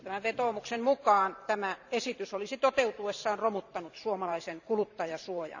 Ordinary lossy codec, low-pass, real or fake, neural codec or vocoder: none; 7.2 kHz; fake; vocoder, 44.1 kHz, 128 mel bands every 512 samples, BigVGAN v2